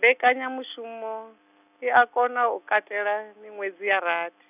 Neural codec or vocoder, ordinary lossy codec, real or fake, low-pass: none; none; real; 3.6 kHz